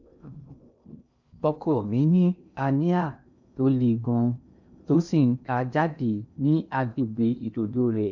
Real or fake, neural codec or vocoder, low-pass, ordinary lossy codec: fake; codec, 16 kHz in and 24 kHz out, 0.6 kbps, FocalCodec, streaming, 2048 codes; 7.2 kHz; none